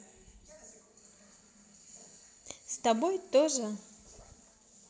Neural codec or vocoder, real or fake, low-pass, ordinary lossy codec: none; real; none; none